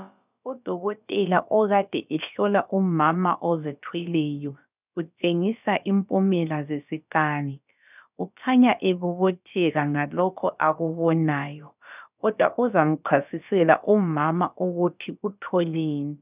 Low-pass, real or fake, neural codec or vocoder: 3.6 kHz; fake; codec, 16 kHz, about 1 kbps, DyCAST, with the encoder's durations